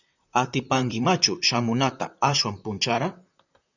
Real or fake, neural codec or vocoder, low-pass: fake; vocoder, 44.1 kHz, 128 mel bands, Pupu-Vocoder; 7.2 kHz